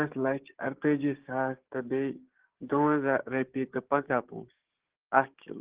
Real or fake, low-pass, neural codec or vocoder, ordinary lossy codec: fake; 3.6 kHz; codec, 16 kHz, 6 kbps, DAC; Opus, 16 kbps